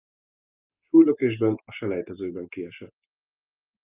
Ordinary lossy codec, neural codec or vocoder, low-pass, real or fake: Opus, 32 kbps; none; 3.6 kHz; real